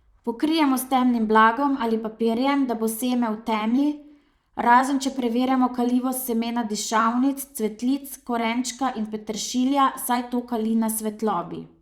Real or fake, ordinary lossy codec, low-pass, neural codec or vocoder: fake; Opus, 64 kbps; 19.8 kHz; vocoder, 44.1 kHz, 128 mel bands, Pupu-Vocoder